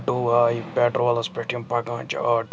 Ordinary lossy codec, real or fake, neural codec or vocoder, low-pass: none; real; none; none